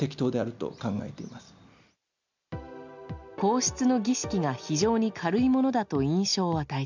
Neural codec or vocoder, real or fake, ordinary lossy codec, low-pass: none; real; none; 7.2 kHz